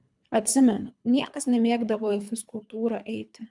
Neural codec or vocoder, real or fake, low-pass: codec, 24 kHz, 3 kbps, HILCodec; fake; 10.8 kHz